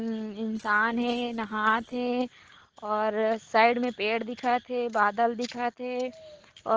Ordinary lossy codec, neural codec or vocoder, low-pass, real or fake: Opus, 16 kbps; none; 7.2 kHz; real